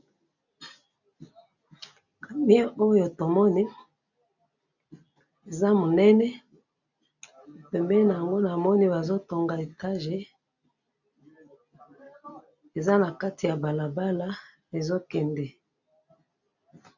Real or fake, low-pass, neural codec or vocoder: real; 7.2 kHz; none